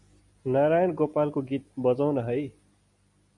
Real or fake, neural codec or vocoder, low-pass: real; none; 10.8 kHz